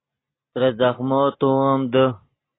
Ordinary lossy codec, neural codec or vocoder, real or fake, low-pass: AAC, 16 kbps; none; real; 7.2 kHz